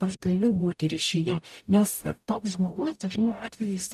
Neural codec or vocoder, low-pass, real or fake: codec, 44.1 kHz, 0.9 kbps, DAC; 14.4 kHz; fake